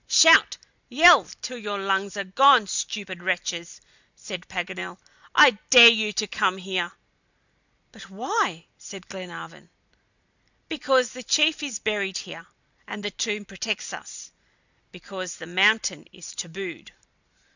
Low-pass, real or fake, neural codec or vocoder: 7.2 kHz; real; none